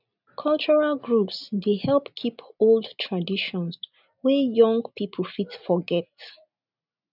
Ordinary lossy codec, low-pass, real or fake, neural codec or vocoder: none; 5.4 kHz; real; none